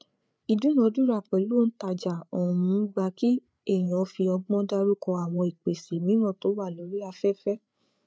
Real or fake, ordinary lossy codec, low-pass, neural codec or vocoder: fake; none; none; codec, 16 kHz, 8 kbps, FreqCodec, larger model